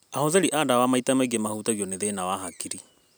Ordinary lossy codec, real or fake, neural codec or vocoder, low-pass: none; real; none; none